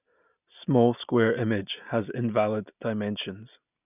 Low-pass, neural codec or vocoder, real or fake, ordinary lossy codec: 3.6 kHz; none; real; none